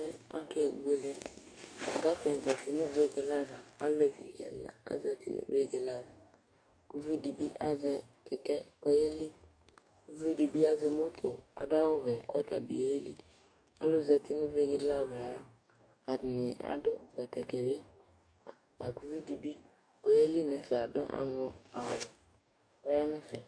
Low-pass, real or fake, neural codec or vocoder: 9.9 kHz; fake; codec, 44.1 kHz, 2.6 kbps, DAC